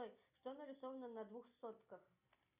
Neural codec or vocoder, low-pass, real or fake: none; 3.6 kHz; real